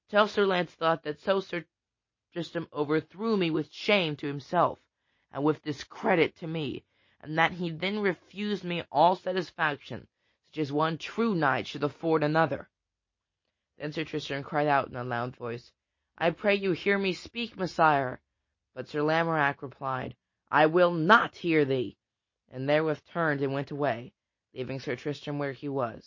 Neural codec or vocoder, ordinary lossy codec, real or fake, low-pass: none; MP3, 32 kbps; real; 7.2 kHz